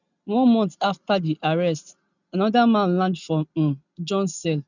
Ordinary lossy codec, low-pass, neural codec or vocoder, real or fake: none; 7.2 kHz; none; real